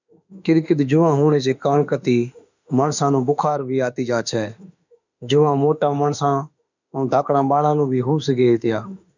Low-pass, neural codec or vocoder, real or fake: 7.2 kHz; autoencoder, 48 kHz, 32 numbers a frame, DAC-VAE, trained on Japanese speech; fake